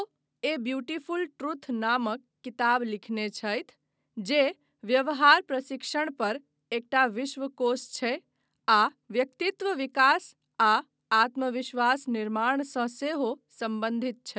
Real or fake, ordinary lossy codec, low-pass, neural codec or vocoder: real; none; none; none